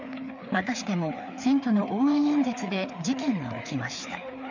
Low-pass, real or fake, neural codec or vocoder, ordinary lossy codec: 7.2 kHz; fake; codec, 16 kHz, 4 kbps, FreqCodec, larger model; none